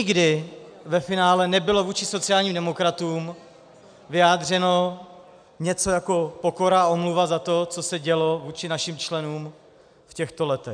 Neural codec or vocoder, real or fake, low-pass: none; real; 9.9 kHz